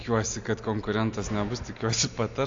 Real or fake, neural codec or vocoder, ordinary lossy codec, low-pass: real; none; AAC, 48 kbps; 7.2 kHz